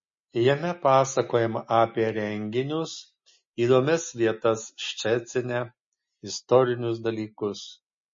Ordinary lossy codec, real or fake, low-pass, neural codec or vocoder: MP3, 32 kbps; real; 7.2 kHz; none